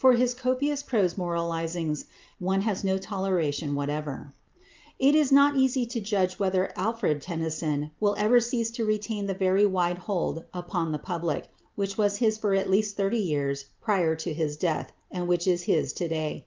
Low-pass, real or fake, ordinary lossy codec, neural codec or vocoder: 7.2 kHz; real; Opus, 24 kbps; none